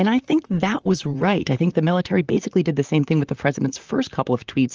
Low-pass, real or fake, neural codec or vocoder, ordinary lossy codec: 7.2 kHz; fake; vocoder, 44.1 kHz, 128 mel bands, Pupu-Vocoder; Opus, 24 kbps